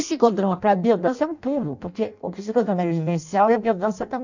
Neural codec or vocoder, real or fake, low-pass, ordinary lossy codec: codec, 16 kHz in and 24 kHz out, 0.6 kbps, FireRedTTS-2 codec; fake; 7.2 kHz; none